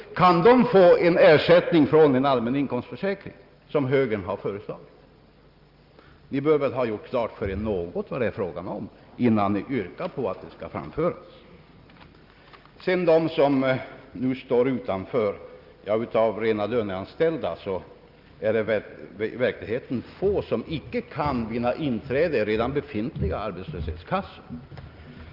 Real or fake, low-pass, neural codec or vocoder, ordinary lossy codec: real; 5.4 kHz; none; Opus, 24 kbps